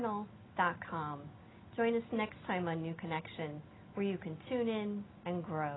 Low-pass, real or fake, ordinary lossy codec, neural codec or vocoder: 7.2 kHz; real; AAC, 16 kbps; none